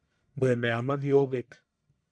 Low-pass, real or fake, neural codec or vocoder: 9.9 kHz; fake; codec, 44.1 kHz, 1.7 kbps, Pupu-Codec